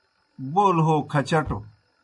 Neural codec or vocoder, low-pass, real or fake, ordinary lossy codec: none; 10.8 kHz; real; AAC, 64 kbps